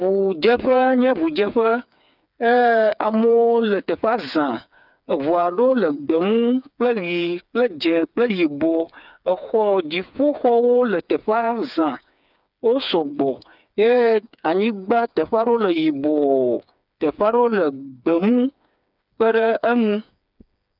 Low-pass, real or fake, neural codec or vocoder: 5.4 kHz; fake; codec, 16 kHz, 4 kbps, FreqCodec, smaller model